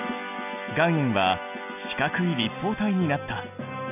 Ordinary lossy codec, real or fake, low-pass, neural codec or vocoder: none; real; 3.6 kHz; none